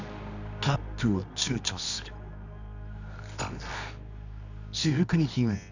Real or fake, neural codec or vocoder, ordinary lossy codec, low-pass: fake; codec, 24 kHz, 0.9 kbps, WavTokenizer, medium music audio release; none; 7.2 kHz